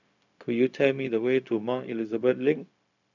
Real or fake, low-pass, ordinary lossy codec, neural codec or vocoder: fake; 7.2 kHz; none; codec, 16 kHz, 0.4 kbps, LongCat-Audio-Codec